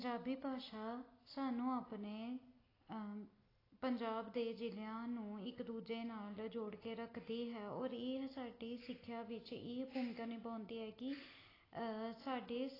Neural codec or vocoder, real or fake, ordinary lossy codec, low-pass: none; real; AAC, 24 kbps; 5.4 kHz